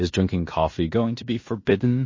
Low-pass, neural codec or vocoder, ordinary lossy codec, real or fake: 7.2 kHz; codec, 16 kHz in and 24 kHz out, 0.9 kbps, LongCat-Audio-Codec, fine tuned four codebook decoder; MP3, 32 kbps; fake